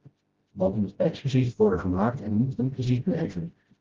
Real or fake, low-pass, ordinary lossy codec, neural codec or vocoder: fake; 7.2 kHz; Opus, 32 kbps; codec, 16 kHz, 0.5 kbps, FreqCodec, smaller model